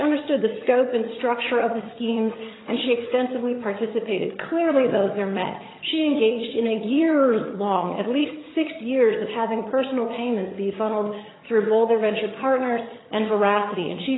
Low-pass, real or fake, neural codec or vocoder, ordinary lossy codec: 7.2 kHz; fake; vocoder, 22.05 kHz, 80 mel bands, HiFi-GAN; AAC, 16 kbps